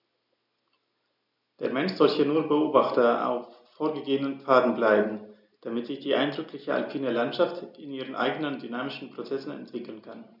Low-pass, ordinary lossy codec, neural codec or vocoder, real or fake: 5.4 kHz; none; none; real